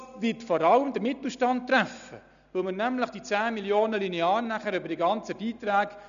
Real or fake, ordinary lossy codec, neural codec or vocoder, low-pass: real; none; none; 7.2 kHz